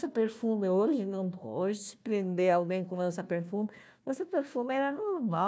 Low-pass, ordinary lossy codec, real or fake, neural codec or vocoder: none; none; fake; codec, 16 kHz, 1 kbps, FunCodec, trained on Chinese and English, 50 frames a second